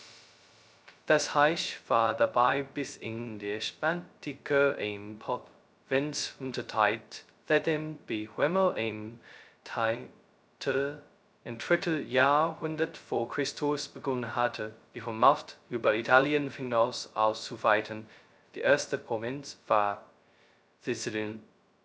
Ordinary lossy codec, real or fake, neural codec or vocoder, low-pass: none; fake; codec, 16 kHz, 0.2 kbps, FocalCodec; none